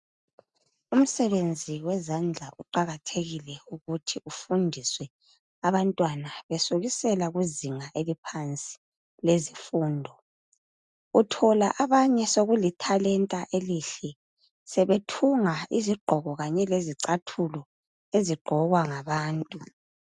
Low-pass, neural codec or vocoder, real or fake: 10.8 kHz; none; real